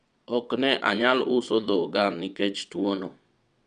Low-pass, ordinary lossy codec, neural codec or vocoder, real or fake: 9.9 kHz; none; vocoder, 22.05 kHz, 80 mel bands, WaveNeXt; fake